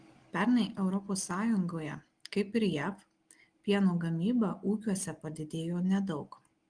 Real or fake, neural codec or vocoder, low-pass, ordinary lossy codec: real; none; 9.9 kHz; Opus, 24 kbps